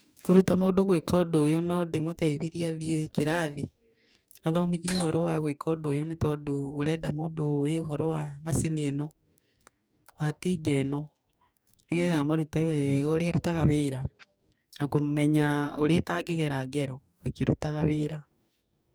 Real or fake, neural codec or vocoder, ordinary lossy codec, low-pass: fake; codec, 44.1 kHz, 2.6 kbps, DAC; none; none